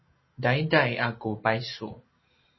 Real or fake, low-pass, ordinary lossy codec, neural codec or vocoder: real; 7.2 kHz; MP3, 24 kbps; none